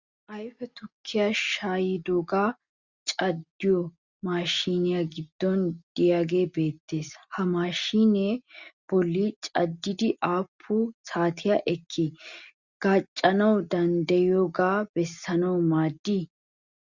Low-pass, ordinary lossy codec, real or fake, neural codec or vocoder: 7.2 kHz; Opus, 64 kbps; real; none